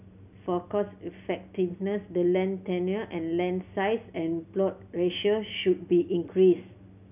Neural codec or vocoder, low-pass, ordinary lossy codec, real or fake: none; 3.6 kHz; none; real